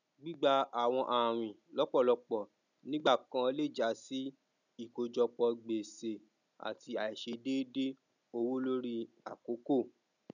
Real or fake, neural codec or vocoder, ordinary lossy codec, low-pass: real; none; none; 7.2 kHz